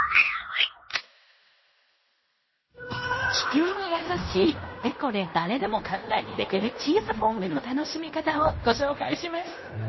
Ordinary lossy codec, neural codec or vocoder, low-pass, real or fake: MP3, 24 kbps; codec, 16 kHz in and 24 kHz out, 0.9 kbps, LongCat-Audio-Codec, fine tuned four codebook decoder; 7.2 kHz; fake